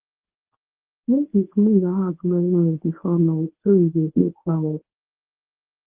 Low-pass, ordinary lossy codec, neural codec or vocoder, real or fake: 3.6 kHz; Opus, 16 kbps; codec, 24 kHz, 0.9 kbps, WavTokenizer, medium speech release version 1; fake